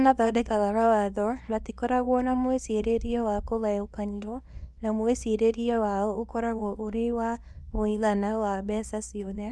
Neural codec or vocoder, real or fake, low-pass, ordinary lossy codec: codec, 24 kHz, 0.9 kbps, WavTokenizer, small release; fake; none; none